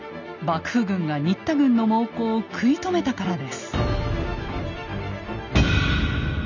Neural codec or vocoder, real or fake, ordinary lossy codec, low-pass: none; real; none; 7.2 kHz